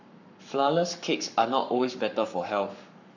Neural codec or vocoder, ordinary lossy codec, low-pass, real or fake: codec, 44.1 kHz, 7.8 kbps, Pupu-Codec; none; 7.2 kHz; fake